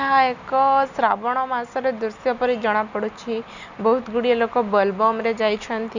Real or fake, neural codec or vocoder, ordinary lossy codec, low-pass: real; none; none; 7.2 kHz